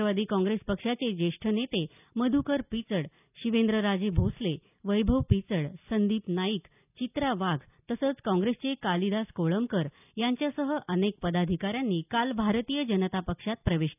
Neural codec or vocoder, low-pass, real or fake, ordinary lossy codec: none; 3.6 kHz; real; none